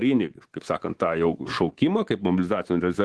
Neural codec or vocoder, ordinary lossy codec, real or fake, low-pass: codec, 24 kHz, 3.1 kbps, DualCodec; Opus, 16 kbps; fake; 10.8 kHz